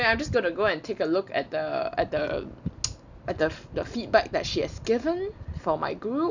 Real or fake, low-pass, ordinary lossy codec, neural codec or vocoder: real; 7.2 kHz; none; none